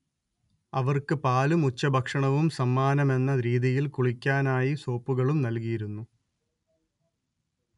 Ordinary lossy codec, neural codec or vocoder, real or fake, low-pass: none; none; real; 10.8 kHz